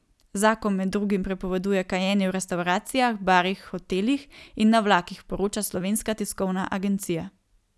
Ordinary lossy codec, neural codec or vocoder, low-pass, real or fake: none; none; none; real